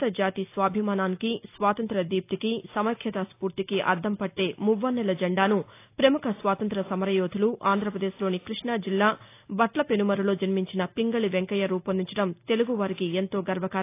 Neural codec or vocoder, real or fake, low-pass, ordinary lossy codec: none; real; 3.6 kHz; AAC, 24 kbps